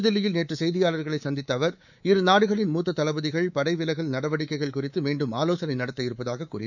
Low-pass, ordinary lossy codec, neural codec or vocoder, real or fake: 7.2 kHz; none; codec, 24 kHz, 3.1 kbps, DualCodec; fake